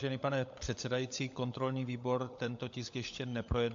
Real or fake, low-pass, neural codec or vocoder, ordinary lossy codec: fake; 7.2 kHz; codec, 16 kHz, 16 kbps, FunCodec, trained on LibriTTS, 50 frames a second; AAC, 64 kbps